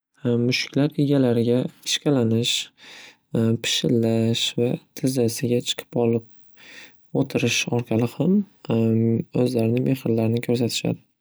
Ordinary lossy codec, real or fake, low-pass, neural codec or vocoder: none; real; none; none